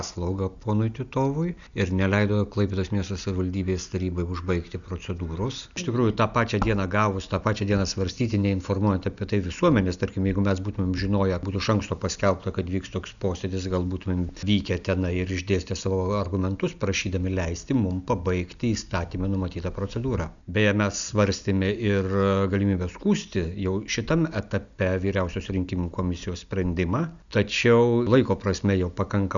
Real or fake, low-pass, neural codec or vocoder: real; 7.2 kHz; none